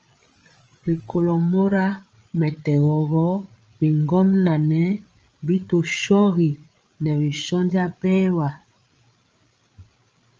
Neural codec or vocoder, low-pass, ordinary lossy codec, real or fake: codec, 16 kHz, 8 kbps, FreqCodec, larger model; 7.2 kHz; Opus, 24 kbps; fake